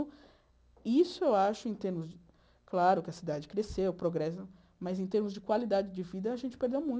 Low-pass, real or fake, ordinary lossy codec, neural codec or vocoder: none; real; none; none